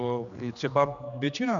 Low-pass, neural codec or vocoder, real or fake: 7.2 kHz; codec, 16 kHz, 2 kbps, X-Codec, HuBERT features, trained on general audio; fake